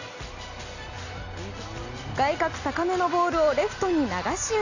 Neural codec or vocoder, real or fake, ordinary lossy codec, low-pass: none; real; none; 7.2 kHz